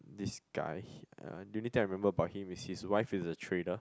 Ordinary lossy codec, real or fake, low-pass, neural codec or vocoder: none; real; none; none